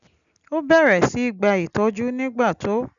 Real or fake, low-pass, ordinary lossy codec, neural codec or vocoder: real; 7.2 kHz; none; none